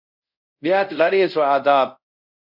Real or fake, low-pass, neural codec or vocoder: fake; 5.4 kHz; codec, 24 kHz, 0.5 kbps, DualCodec